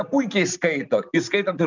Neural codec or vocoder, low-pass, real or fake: none; 7.2 kHz; real